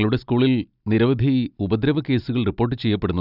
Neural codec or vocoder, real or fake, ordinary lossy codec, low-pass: none; real; none; 5.4 kHz